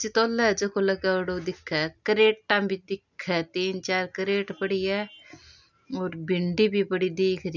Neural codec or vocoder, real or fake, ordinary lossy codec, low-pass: none; real; none; 7.2 kHz